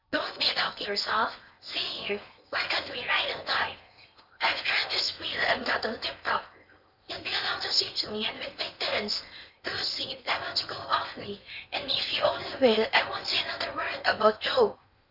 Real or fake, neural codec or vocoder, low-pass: fake; codec, 16 kHz in and 24 kHz out, 0.8 kbps, FocalCodec, streaming, 65536 codes; 5.4 kHz